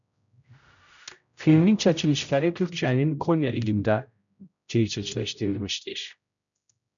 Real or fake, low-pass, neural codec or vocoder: fake; 7.2 kHz; codec, 16 kHz, 0.5 kbps, X-Codec, HuBERT features, trained on general audio